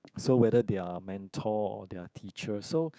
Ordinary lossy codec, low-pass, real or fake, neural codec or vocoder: none; none; fake; codec, 16 kHz, 6 kbps, DAC